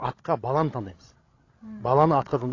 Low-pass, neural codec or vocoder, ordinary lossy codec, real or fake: 7.2 kHz; codec, 16 kHz, 16 kbps, FreqCodec, larger model; AAC, 32 kbps; fake